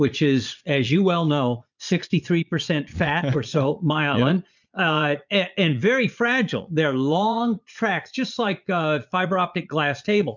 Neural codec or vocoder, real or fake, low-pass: vocoder, 22.05 kHz, 80 mel bands, Vocos; fake; 7.2 kHz